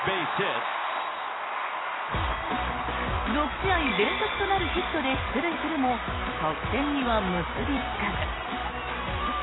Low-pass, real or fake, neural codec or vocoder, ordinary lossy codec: 7.2 kHz; real; none; AAC, 16 kbps